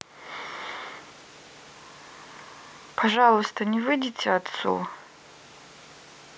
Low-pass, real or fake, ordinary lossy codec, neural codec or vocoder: none; real; none; none